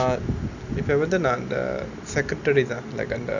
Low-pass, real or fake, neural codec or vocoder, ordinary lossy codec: 7.2 kHz; real; none; none